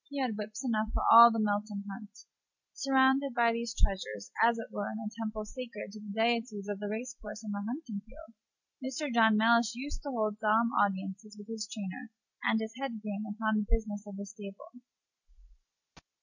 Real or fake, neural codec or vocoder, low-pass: real; none; 7.2 kHz